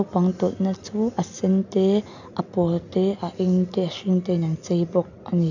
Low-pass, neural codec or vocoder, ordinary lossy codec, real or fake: 7.2 kHz; none; none; real